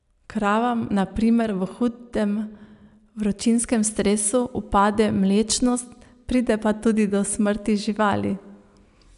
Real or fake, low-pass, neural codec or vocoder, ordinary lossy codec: real; 10.8 kHz; none; none